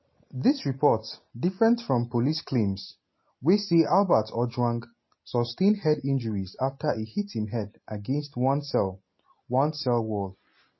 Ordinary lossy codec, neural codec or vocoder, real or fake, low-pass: MP3, 24 kbps; none; real; 7.2 kHz